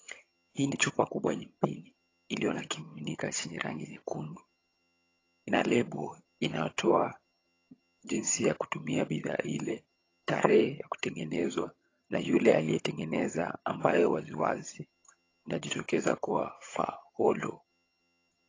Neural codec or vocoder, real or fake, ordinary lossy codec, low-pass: vocoder, 22.05 kHz, 80 mel bands, HiFi-GAN; fake; AAC, 32 kbps; 7.2 kHz